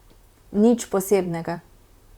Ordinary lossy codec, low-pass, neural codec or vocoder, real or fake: Opus, 64 kbps; 19.8 kHz; vocoder, 44.1 kHz, 128 mel bands, Pupu-Vocoder; fake